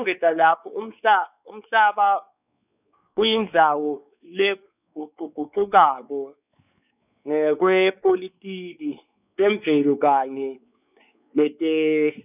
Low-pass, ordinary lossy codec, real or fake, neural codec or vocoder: 3.6 kHz; none; fake; codec, 16 kHz, 4 kbps, X-Codec, WavLM features, trained on Multilingual LibriSpeech